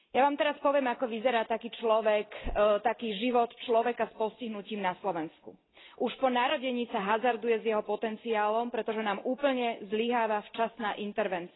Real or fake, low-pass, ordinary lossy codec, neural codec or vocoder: real; 7.2 kHz; AAC, 16 kbps; none